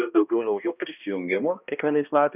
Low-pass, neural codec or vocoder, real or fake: 3.6 kHz; codec, 16 kHz, 1 kbps, X-Codec, HuBERT features, trained on balanced general audio; fake